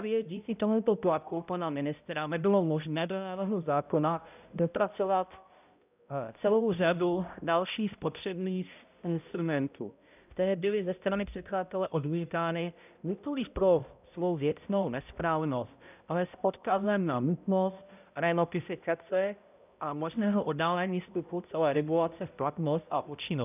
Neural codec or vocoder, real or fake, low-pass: codec, 16 kHz, 0.5 kbps, X-Codec, HuBERT features, trained on balanced general audio; fake; 3.6 kHz